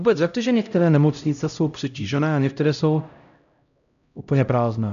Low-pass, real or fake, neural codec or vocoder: 7.2 kHz; fake; codec, 16 kHz, 0.5 kbps, X-Codec, HuBERT features, trained on LibriSpeech